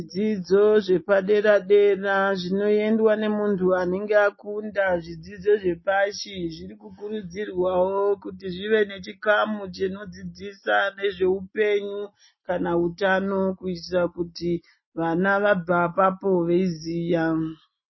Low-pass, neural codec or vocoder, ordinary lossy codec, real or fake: 7.2 kHz; none; MP3, 24 kbps; real